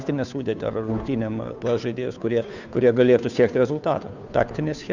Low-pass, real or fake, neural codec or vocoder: 7.2 kHz; fake; codec, 16 kHz, 2 kbps, FunCodec, trained on Chinese and English, 25 frames a second